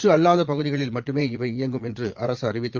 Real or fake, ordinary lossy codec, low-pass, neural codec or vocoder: fake; Opus, 32 kbps; 7.2 kHz; vocoder, 22.05 kHz, 80 mel bands, Vocos